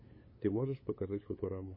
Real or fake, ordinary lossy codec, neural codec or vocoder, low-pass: fake; MP3, 24 kbps; codec, 16 kHz, 8 kbps, FunCodec, trained on LibriTTS, 25 frames a second; 5.4 kHz